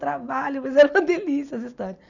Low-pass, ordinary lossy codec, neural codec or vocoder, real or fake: 7.2 kHz; none; none; real